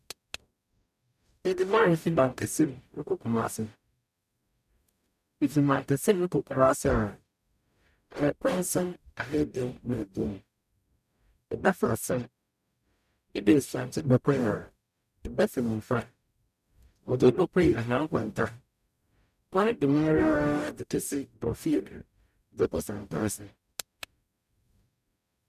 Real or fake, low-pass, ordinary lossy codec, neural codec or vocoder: fake; 14.4 kHz; none; codec, 44.1 kHz, 0.9 kbps, DAC